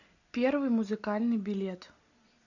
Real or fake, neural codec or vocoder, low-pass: real; none; 7.2 kHz